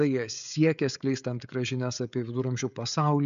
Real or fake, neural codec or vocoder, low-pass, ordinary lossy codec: fake; codec, 16 kHz, 16 kbps, FreqCodec, smaller model; 7.2 kHz; AAC, 96 kbps